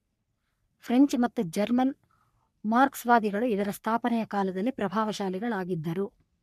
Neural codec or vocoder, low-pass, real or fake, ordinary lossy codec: codec, 44.1 kHz, 3.4 kbps, Pupu-Codec; 14.4 kHz; fake; MP3, 96 kbps